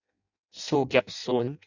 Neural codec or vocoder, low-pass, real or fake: codec, 16 kHz in and 24 kHz out, 0.6 kbps, FireRedTTS-2 codec; 7.2 kHz; fake